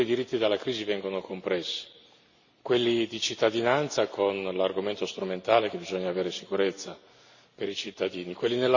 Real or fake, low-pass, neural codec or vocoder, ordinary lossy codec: real; 7.2 kHz; none; none